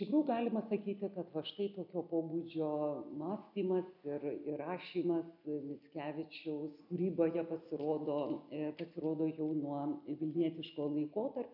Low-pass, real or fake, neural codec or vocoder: 5.4 kHz; real; none